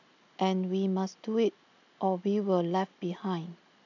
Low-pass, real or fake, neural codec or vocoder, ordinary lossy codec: 7.2 kHz; real; none; none